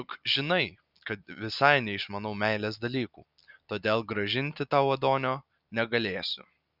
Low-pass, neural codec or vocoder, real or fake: 5.4 kHz; none; real